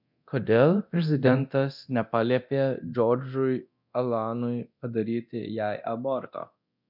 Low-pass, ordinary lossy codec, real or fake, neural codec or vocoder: 5.4 kHz; MP3, 48 kbps; fake; codec, 24 kHz, 0.9 kbps, DualCodec